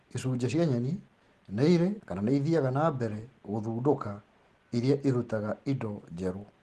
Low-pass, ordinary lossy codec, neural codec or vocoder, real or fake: 10.8 kHz; Opus, 16 kbps; none; real